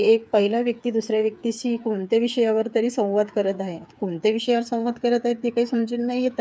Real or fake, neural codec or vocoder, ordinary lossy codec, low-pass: fake; codec, 16 kHz, 8 kbps, FreqCodec, smaller model; none; none